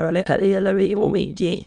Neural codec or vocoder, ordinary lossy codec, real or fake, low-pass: autoencoder, 22.05 kHz, a latent of 192 numbers a frame, VITS, trained on many speakers; none; fake; 9.9 kHz